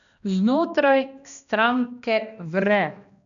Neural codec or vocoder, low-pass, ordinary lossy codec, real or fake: codec, 16 kHz, 1 kbps, X-Codec, HuBERT features, trained on balanced general audio; 7.2 kHz; none; fake